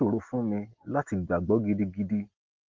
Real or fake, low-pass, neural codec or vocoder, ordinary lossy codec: real; 7.2 kHz; none; Opus, 16 kbps